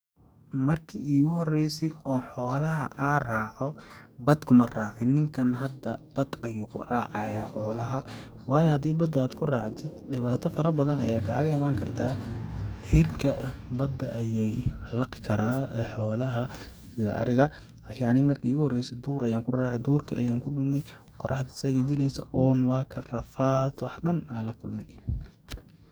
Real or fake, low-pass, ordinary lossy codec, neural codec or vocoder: fake; none; none; codec, 44.1 kHz, 2.6 kbps, DAC